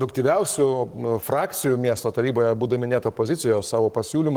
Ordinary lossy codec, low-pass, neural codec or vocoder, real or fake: Opus, 24 kbps; 19.8 kHz; codec, 44.1 kHz, 7.8 kbps, Pupu-Codec; fake